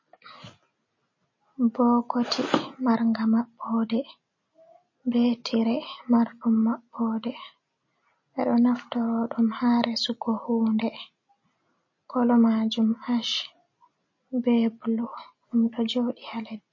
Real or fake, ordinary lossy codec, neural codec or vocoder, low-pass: real; MP3, 32 kbps; none; 7.2 kHz